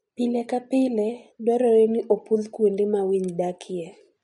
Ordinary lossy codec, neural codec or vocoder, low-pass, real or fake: MP3, 48 kbps; none; 19.8 kHz; real